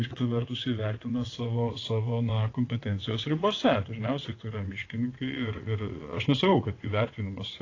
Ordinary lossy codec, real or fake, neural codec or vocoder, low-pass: AAC, 32 kbps; fake; vocoder, 22.05 kHz, 80 mel bands, WaveNeXt; 7.2 kHz